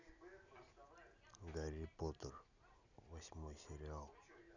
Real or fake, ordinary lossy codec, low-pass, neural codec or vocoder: real; none; 7.2 kHz; none